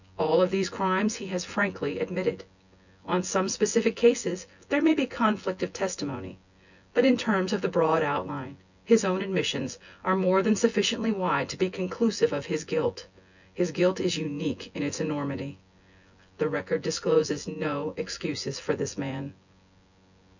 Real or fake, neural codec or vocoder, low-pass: fake; vocoder, 24 kHz, 100 mel bands, Vocos; 7.2 kHz